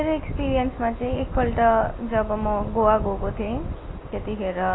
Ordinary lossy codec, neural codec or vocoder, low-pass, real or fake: AAC, 16 kbps; none; 7.2 kHz; real